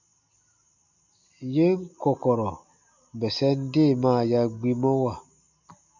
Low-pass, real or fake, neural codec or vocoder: 7.2 kHz; real; none